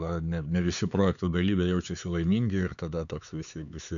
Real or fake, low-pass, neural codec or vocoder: fake; 7.2 kHz; codec, 16 kHz, 4 kbps, X-Codec, HuBERT features, trained on LibriSpeech